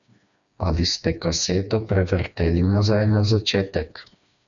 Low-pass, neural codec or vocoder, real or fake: 7.2 kHz; codec, 16 kHz, 2 kbps, FreqCodec, smaller model; fake